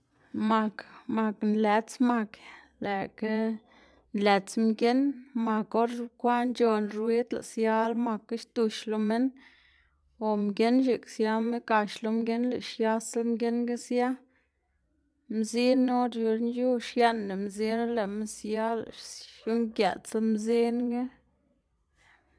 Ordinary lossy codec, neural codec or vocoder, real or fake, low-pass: none; vocoder, 22.05 kHz, 80 mel bands, Vocos; fake; none